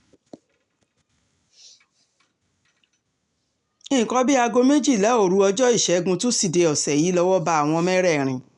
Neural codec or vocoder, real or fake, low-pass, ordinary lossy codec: none; real; 10.8 kHz; none